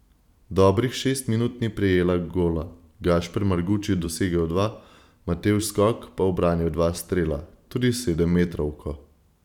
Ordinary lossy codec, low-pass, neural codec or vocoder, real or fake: none; 19.8 kHz; vocoder, 44.1 kHz, 128 mel bands every 512 samples, BigVGAN v2; fake